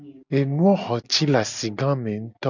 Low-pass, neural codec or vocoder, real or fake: 7.2 kHz; none; real